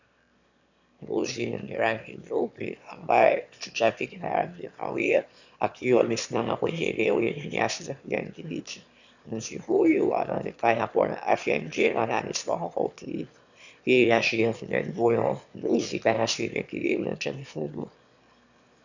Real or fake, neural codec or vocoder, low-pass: fake; autoencoder, 22.05 kHz, a latent of 192 numbers a frame, VITS, trained on one speaker; 7.2 kHz